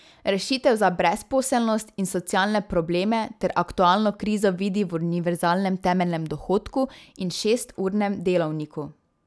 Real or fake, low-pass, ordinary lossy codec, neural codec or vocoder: real; none; none; none